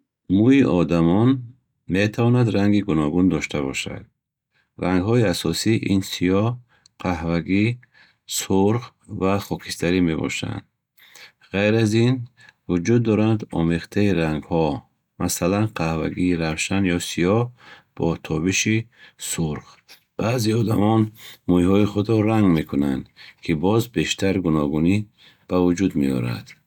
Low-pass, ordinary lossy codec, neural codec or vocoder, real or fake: 19.8 kHz; none; none; real